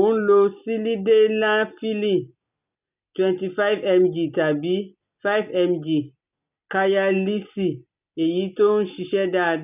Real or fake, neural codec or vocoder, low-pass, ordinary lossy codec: real; none; 3.6 kHz; none